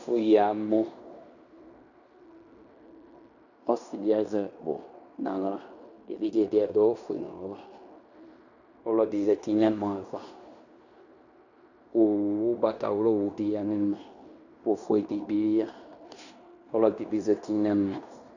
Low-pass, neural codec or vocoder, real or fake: 7.2 kHz; codec, 16 kHz in and 24 kHz out, 0.9 kbps, LongCat-Audio-Codec, fine tuned four codebook decoder; fake